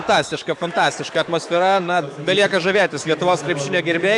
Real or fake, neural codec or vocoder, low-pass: fake; codec, 44.1 kHz, 7.8 kbps, DAC; 10.8 kHz